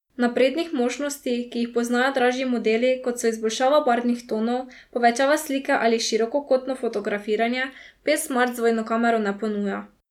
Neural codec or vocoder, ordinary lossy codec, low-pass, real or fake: none; none; 19.8 kHz; real